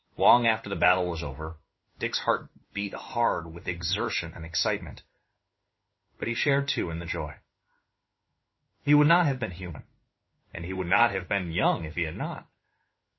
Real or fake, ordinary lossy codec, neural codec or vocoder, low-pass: fake; MP3, 24 kbps; codec, 16 kHz in and 24 kHz out, 1 kbps, XY-Tokenizer; 7.2 kHz